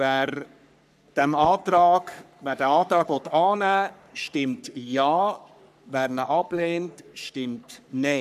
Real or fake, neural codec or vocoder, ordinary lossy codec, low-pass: fake; codec, 44.1 kHz, 3.4 kbps, Pupu-Codec; none; 14.4 kHz